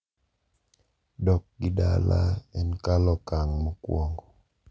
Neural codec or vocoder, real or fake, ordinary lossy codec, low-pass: none; real; none; none